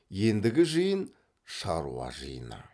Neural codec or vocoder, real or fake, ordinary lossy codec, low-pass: none; real; none; none